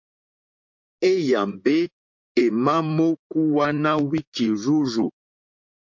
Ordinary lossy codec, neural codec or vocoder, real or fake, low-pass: MP3, 48 kbps; vocoder, 44.1 kHz, 128 mel bands, Pupu-Vocoder; fake; 7.2 kHz